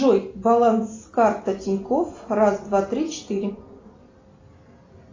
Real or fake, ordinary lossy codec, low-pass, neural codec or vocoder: real; AAC, 32 kbps; 7.2 kHz; none